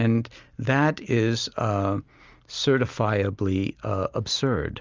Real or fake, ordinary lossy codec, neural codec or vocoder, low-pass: real; Opus, 32 kbps; none; 7.2 kHz